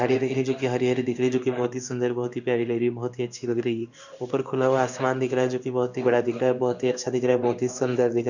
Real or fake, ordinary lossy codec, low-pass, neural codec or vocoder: fake; none; 7.2 kHz; codec, 16 kHz in and 24 kHz out, 1 kbps, XY-Tokenizer